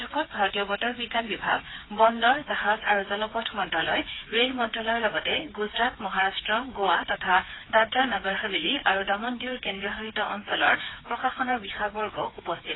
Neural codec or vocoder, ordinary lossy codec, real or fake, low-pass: codec, 16 kHz, 4 kbps, FreqCodec, smaller model; AAC, 16 kbps; fake; 7.2 kHz